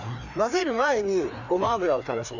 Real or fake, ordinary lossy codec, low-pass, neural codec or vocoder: fake; none; 7.2 kHz; codec, 16 kHz, 2 kbps, FreqCodec, larger model